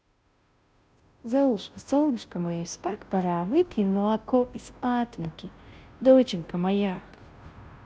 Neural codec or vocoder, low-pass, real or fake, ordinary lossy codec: codec, 16 kHz, 0.5 kbps, FunCodec, trained on Chinese and English, 25 frames a second; none; fake; none